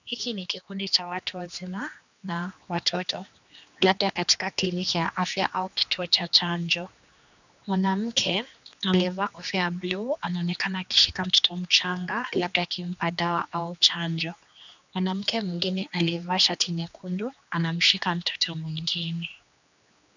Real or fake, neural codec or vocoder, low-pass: fake; codec, 16 kHz, 2 kbps, X-Codec, HuBERT features, trained on general audio; 7.2 kHz